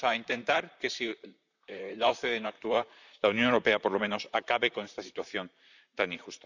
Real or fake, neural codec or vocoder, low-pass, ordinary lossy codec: fake; vocoder, 44.1 kHz, 128 mel bands, Pupu-Vocoder; 7.2 kHz; none